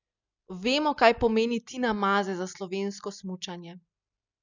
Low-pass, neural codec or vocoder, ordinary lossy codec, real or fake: 7.2 kHz; none; none; real